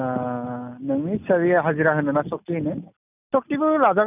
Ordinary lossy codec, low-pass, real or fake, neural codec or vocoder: none; 3.6 kHz; real; none